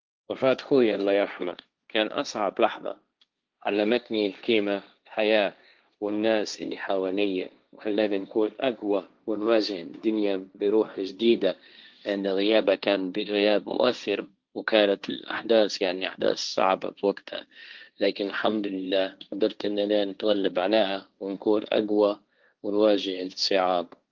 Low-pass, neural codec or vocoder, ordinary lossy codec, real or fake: 7.2 kHz; codec, 16 kHz, 1.1 kbps, Voila-Tokenizer; Opus, 32 kbps; fake